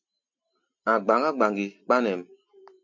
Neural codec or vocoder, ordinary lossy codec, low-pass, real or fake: none; MP3, 48 kbps; 7.2 kHz; real